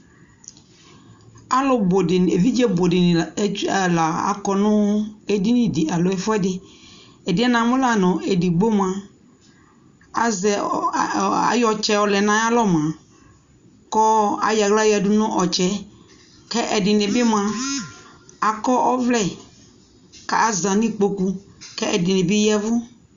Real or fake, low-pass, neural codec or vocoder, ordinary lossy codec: real; 7.2 kHz; none; Opus, 64 kbps